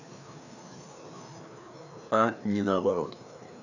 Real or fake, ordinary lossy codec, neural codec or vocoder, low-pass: fake; none; codec, 16 kHz, 2 kbps, FreqCodec, larger model; 7.2 kHz